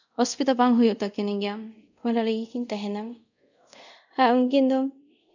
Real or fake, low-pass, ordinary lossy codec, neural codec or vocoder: fake; 7.2 kHz; none; codec, 24 kHz, 0.5 kbps, DualCodec